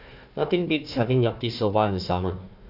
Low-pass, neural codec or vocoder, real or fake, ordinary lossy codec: 5.4 kHz; codec, 16 kHz, 1 kbps, FunCodec, trained on Chinese and English, 50 frames a second; fake; none